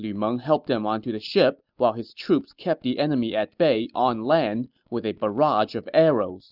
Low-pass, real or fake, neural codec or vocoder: 5.4 kHz; real; none